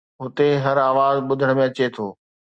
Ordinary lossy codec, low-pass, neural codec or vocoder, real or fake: Opus, 64 kbps; 9.9 kHz; none; real